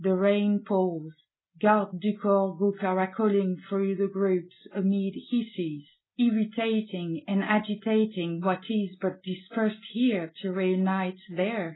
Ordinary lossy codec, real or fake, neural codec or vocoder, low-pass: AAC, 16 kbps; real; none; 7.2 kHz